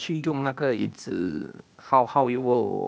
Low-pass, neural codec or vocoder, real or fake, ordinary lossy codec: none; codec, 16 kHz, 0.8 kbps, ZipCodec; fake; none